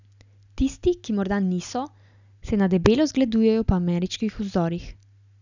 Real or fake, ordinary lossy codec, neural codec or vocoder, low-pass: real; none; none; 7.2 kHz